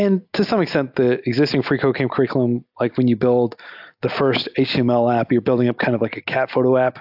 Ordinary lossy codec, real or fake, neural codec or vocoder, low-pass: AAC, 48 kbps; real; none; 5.4 kHz